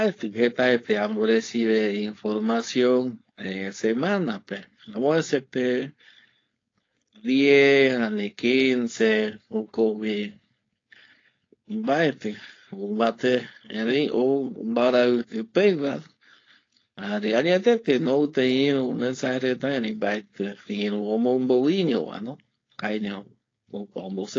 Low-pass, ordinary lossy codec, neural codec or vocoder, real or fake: 7.2 kHz; AAC, 32 kbps; codec, 16 kHz, 4.8 kbps, FACodec; fake